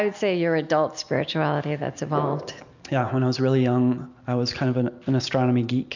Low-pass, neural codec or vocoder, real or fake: 7.2 kHz; none; real